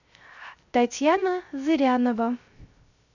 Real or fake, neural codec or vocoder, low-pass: fake; codec, 16 kHz, 0.3 kbps, FocalCodec; 7.2 kHz